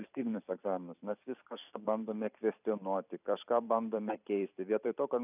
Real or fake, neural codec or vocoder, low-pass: fake; vocoder, 44.1 kHz, 128 mel bands every 256 samples, BigVGAN v2; 3.6 kHz